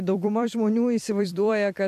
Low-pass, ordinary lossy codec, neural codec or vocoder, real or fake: 14.4 kHz; MP3, 96 kbps; vocoder, 44.1 kHz, 128 mel bands every 256 samples, BigVGAN v2; fake